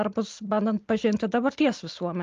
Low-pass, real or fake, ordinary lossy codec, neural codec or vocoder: 7.2 kHz; real; Opus, 32 kbps; none